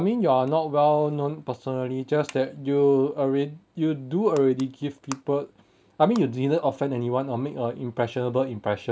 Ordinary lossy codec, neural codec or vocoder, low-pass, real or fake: none; none; none; real